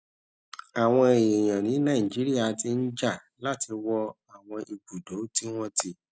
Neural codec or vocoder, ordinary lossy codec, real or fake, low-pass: none; none; real; none